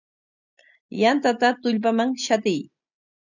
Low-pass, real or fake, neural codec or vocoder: 7.2 kHz; real; none